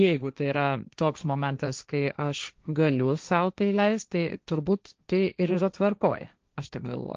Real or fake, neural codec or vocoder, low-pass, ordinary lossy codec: fake; codec, 16 kHz, 1.1 kbps, Voila-Tokenizer; 7.2 kHz; Opus, 24 kbps